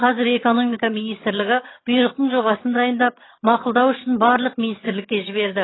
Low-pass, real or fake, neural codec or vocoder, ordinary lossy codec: 7.2 kHz; fake; vocoder, 22.05 kHz, 80 mel bands, HiFi-GAN; AAC, 16 kbps